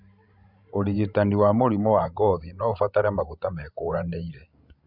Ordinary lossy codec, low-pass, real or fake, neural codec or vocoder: Opus, 64 kbps; 5.4 kHz; fake; codec, 16 kHz, 16 kbps, FreqCodec, larger model